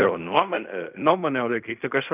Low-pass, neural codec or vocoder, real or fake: 3.6 kHz; codec, 16 kHz in and 24 kHz out, 0.4 kbps, LongCat-Audio-Codec, fine tuned four codebook decoder; fake